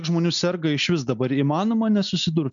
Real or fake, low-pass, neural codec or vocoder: real; 7.2 kHz; none